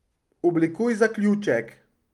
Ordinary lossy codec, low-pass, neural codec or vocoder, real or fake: Opus, 32 kbps; 19.8 kHz; none; real